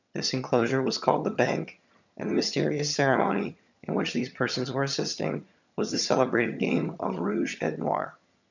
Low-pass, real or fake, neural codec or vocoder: 7.2 kHz; fake; vocoder, 22.05 kHz, 80 mel bands, HiFi-GAN